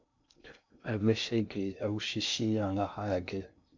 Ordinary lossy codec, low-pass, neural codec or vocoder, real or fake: MP3, 48 kbps; 7.2 kHz; codec, 16 kHz in and 24 kHz out, 0.6 kbps, FocalCodec, streaming, 2048 codes; fake